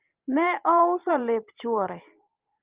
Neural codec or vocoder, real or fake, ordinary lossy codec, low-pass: vocoder, 44.1 kHz, 128 mel bands every 512 samples, BigVGAN v2; fake; Opus, 32 kbps; 3.6 kHz